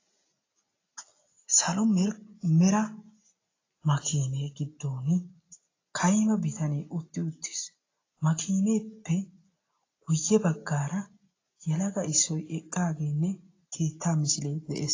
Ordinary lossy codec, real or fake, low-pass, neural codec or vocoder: AAC, 32 kbps; real; 7.2 kHz; none